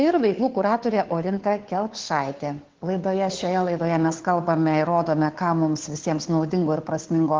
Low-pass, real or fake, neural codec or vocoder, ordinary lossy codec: 7.2 kHz; fake; codec, 16 kHz, 2 kbps, FunCodec, trained on Chinese and English, 25 frames a second; Opus, 16 kbps